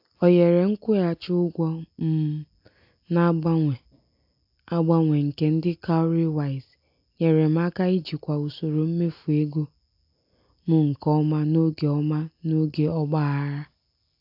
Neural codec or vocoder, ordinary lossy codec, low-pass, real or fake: none; AAC, 48 kbps; 5.4 kHz; real